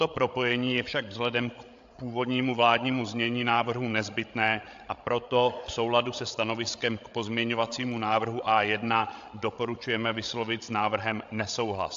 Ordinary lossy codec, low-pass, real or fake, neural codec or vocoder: AAC, 64 kbps; 7.2 kHz; fake; codec, 16 kHz, 16 kbps, FreqCodec, larger model